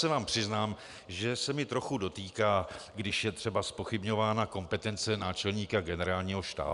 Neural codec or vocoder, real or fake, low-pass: none; real; 10.8 kHz